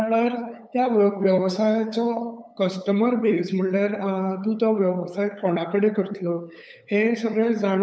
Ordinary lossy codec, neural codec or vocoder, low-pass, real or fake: none; codec, 16 kHz, 8 kbps, FunCodec, trained on LibriTTS, 25 frames a second; none; fake